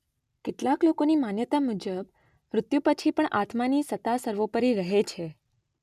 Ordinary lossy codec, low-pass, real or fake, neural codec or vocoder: none; 14.4 kHz; real; none